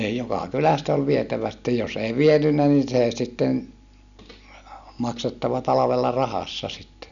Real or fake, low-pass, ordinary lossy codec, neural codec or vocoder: real; 7.2 kHz; none; none